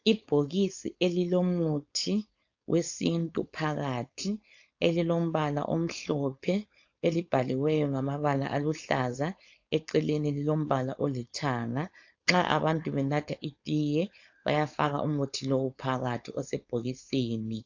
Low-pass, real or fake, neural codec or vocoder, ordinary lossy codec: 7.2 kHz; fake; codec, 16 kHz, 4.8 kbps, FACodec; AAC, 48 kbps